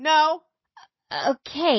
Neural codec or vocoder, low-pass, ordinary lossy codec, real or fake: none; 7.2 kHz; MP3, 24 kbps; real